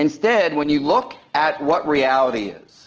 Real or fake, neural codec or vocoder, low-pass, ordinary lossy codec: real; none; 7.2 kHz; Opus, 16 kbps